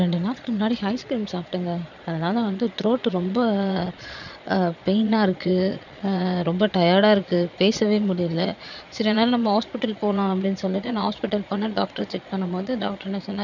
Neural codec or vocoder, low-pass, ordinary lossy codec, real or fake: vocoder, 22.05 kHz, 80 mel bands, Vocos; 7.2 kHz; none; fake